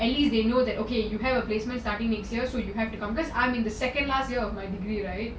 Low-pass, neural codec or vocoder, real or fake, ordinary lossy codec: none; none; real; none